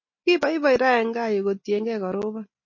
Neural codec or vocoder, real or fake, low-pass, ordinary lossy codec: none; real; 7.2 kHz; MP3, 32 kbps